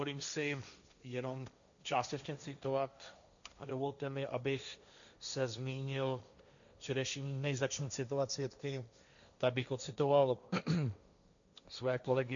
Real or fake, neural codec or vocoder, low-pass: fake; codec, 16 kHz, 1.1 kbps, Voila-Tokenizer; 7.2 kHz